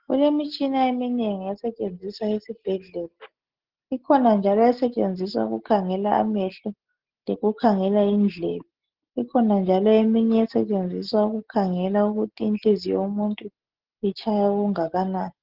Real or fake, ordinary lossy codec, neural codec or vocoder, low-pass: real; Opus, 16 kbps; none; 5.4 kHz